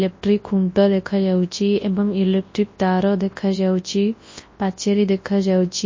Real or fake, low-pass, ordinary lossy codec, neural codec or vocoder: fake; 7.2 kHz; MP3, 32 kbps; codec, 24 kHz, 0.9 kbps, WavTokenizer, large speech release